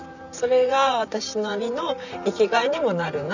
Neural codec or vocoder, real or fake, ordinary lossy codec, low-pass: vocoder, 44.1 kHz, 128 mel bands, Pupu-Vocoder; fake; none; 7.2 kHz